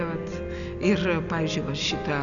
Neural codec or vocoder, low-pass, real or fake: none; 7.2 kHz; real